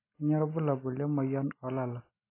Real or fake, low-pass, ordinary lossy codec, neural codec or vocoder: real; 3.6 kHz; AAC, 24 kbps; none